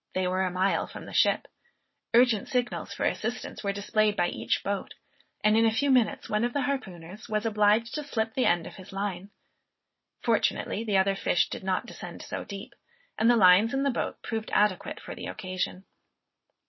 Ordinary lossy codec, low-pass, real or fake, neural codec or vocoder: MP3, 24 kbps; 7.2 kHz; real; none